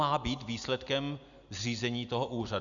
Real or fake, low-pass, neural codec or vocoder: real; 7.2 kHz; none